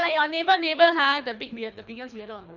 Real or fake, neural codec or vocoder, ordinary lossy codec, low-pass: fake; codec, 24 kHz, 3 kbps, HILCodec; none; 7.2 kHz